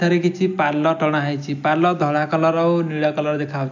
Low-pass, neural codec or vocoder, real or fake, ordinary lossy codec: 7.2 kHz; none; real; none